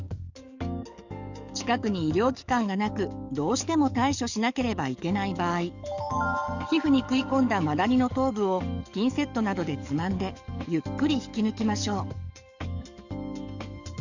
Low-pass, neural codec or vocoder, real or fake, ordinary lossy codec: 7.2 kHz; codec, 44.1 kHz, 7.8 kbps, DAC; fake; none